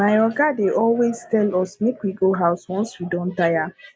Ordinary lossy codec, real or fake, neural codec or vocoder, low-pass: none; real; none; none